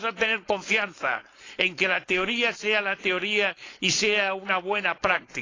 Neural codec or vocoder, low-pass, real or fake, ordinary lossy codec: codec, 16 kHz, 4.8 kbps, FACodec; 7.2 kHz; fake; AAC, 32 kbps